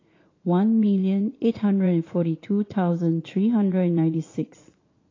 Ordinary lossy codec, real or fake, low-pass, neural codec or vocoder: AAC, 32 kbps; fake; 7.2 kHz; vocoder, 44.1 kHz, 80 mel bands, Vocos